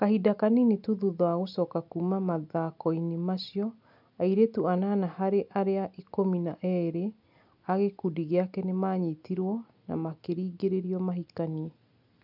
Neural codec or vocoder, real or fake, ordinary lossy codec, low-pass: none; real; none; 5.4 kHz